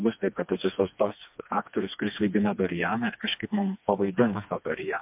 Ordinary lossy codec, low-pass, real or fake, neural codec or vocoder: MP3, 32 kbps; 3.6 kHz; fake; codec, 16 kHz, 2 kbps, FreqCodec, smaller model